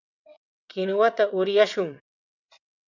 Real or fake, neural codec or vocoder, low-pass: fake; vocoder, 22.05 kHz, 80 mel bands, WaveNeXt; 7.2 kHz